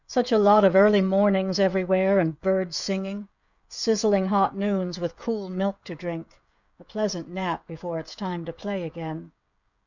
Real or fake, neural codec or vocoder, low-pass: fake; codec, 16 kHz, 16 kbps, FreqCodec, smaller model; 7.2 kHz